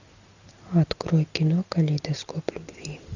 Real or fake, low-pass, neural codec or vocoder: real; 7.2 kHz; none